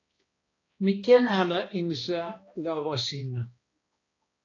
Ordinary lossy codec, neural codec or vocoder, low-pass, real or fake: AAC, 32 kbps; codec, 16 kHz, 1 kbps, X-Codec, HuBERT features, trained on balanced general audio; 7.2 kHz; fake